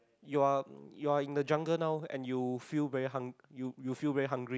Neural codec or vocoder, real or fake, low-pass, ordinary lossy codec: none; real; none; none